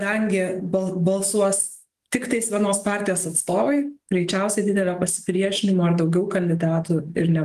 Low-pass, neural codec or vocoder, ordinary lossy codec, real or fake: 14.4 kHz; autoencoder, 48 kHz, 128 numbers a frame, DAC-VAE, trained on Japanese speech; Opus, 24 kbps; fake